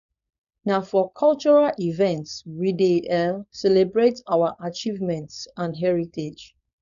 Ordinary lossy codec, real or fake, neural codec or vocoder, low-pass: none; fake; codec, 16 kHz, 4.8 kbps, FACodec; 7.2 kHz